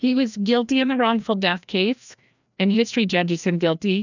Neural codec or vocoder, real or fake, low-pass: codec, 16 kHz, 1 kbps, FreqCodec, larger model; fake; 7.2 kHz